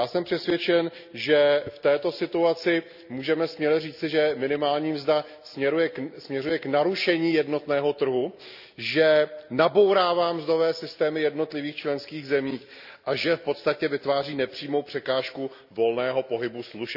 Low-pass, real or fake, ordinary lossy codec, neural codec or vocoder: 5.4 kHz; real; none; none